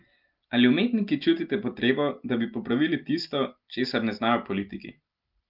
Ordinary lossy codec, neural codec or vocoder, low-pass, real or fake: Opus, 32 kbps; none; 5.4 kHz; real